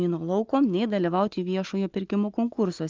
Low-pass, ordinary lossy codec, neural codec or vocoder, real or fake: 7.2 kHz; Opus, 32 kbps; none; real